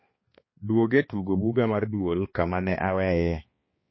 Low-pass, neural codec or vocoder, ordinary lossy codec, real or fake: 7.2 kHz; codec, 16 kHz, 2 kbps, X-Codec, HuBERT features, trained on balanced general audio; MP3, 24 kbps; fake